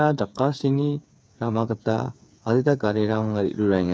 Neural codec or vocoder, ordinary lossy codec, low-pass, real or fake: codec, 16 kHz, 8 kbps, FreqCodec, smaller model; none; none; fake